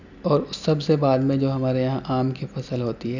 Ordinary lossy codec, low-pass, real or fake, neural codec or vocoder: none; 7.2 kHz; real; none